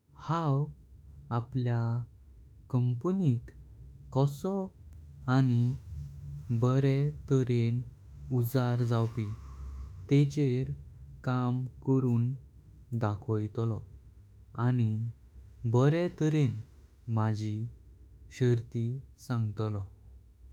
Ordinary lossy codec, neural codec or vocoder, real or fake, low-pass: none; autoencoder, 48 kHz, 32 numbers a frame, DAC-VAE, trained on Japanese speech; fake; 19.8 kHz